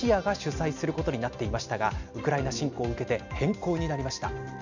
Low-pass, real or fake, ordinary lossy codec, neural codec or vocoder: 7.2 kHz; real; none; none